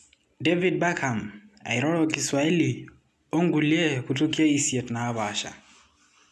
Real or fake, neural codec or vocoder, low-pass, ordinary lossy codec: real; none; none; none